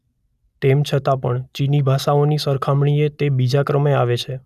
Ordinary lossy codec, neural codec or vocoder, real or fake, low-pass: none; none; real; 14.4 kHz